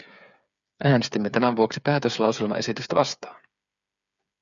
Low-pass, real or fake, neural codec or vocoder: 7.2 kHz; fake; codec, 16 kHz, 8 kbps, FreqCodec, smaller model